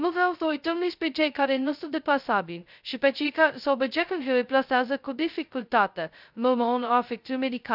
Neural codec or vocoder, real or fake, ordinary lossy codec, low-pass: codec, 16 kHz, 0.2 kbps, FocalCodec; fake; none; 5.4 kHz